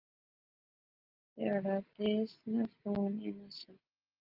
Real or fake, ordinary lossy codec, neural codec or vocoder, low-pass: real; Opus, 32 kbps; none; 5.4 kHz